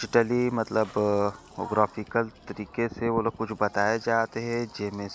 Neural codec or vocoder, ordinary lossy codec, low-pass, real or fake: none; none; none; real